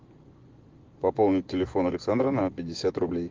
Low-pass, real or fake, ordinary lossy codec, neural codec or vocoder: 7.2 kHz; fake; Opus, 16 kbps; vocoder, 24 kHz, 100 mel bands, Vocos